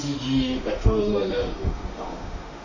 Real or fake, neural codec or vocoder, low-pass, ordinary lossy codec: fake; autoencoder, 48 kHz, 32 numbers a frame, DAC-VAE, trained on Japanese speech; 7.2 kHz; none